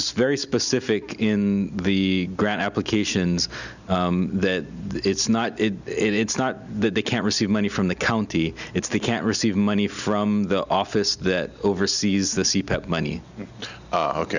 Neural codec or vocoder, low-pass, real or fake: none; 7.2 kHz; real